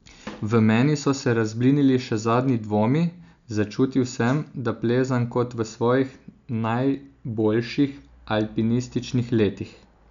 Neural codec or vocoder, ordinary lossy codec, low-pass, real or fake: none; none; 7.2 kHz; real